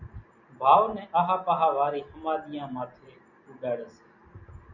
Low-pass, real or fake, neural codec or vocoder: 7.2 kHz; real; none